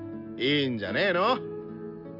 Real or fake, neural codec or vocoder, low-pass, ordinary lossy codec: real; none; 5.4 kHz; none